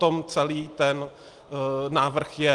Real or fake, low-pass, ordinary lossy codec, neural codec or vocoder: real; 10.8 kHz; Opus, 24 kbps; none